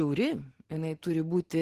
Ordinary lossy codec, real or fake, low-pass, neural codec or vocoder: Opus, 16 kbps; real; 14.4 kHz; none